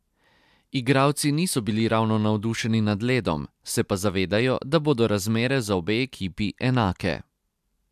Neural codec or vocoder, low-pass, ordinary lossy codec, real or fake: none; 14.4 kHz; MP3, 96 kbps; real